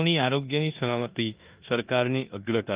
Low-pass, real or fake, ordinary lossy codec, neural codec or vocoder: 3.6 kHz; fake; Opus, 32 kbps; codec, 16 kHz in and 24 kHz out, 0.9 kbps, LongCat-Audio-Codec, four codebook decoder